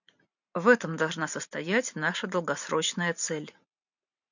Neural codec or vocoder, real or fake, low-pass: vocoder, 44.1 kHz, 128 mel bands every 512 samples, BigVGAN v2; fake; 7.2 kHz